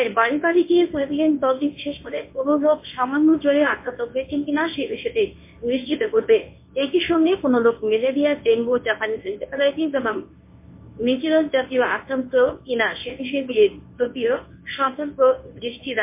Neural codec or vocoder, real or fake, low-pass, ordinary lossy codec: codec, 24 kHz, 0.9 kbps, WavTokenizer, medium speech release version 1; fake; 3.6 kHz; MP3, 24 kbps